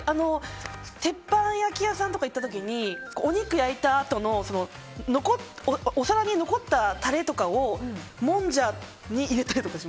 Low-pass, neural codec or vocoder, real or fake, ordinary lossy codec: none; none; real; none